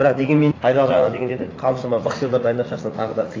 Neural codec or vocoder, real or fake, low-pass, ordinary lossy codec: vocoder, 44.1 kHz, 80 mel bands, Vocos; fake; 7.2 kHz; AAC, 32 kbps